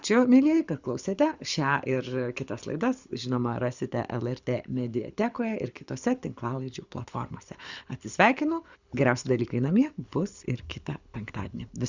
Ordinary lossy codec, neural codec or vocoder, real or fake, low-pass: Opus, 64 kbps; codec, 24 kHz, 6 kbps, HILCodec; fake; 7.2 kHz